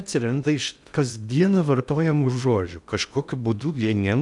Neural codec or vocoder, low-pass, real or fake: codec, 16 kHz in and 24 kHz out, 0.6 kbps, FocalCodec, streaming, 2048 codes; 10.8 kHz; fake